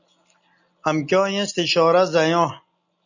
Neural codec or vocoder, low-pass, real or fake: none; 7.2 kHz; real